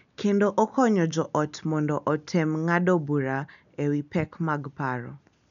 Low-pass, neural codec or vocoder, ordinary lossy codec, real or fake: 7.2 kHz; none; none; real